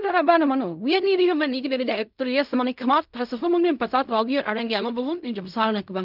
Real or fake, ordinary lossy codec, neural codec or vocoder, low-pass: fake; none; codec, 16 kHz in and 24 kHz out, 0.4 kbps, LongCat-Audio-Codec, fine tuned four codebook decoder; 5.4 kHz